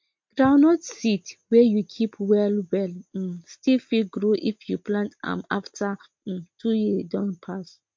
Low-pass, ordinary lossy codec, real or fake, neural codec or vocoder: 7.2 kHz; MP3, 48 kbps; real; none